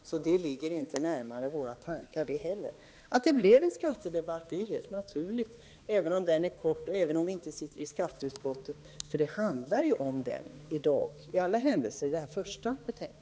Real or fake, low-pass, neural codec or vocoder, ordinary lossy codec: fake; none; codec, 16 kHz, 2 kbps, X-Codec, HuBERT features, trained on balanced general audio; none